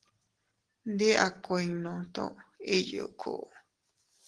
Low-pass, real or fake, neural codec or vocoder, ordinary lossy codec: 10.8 kHz; real; none; Opus, 16 kbps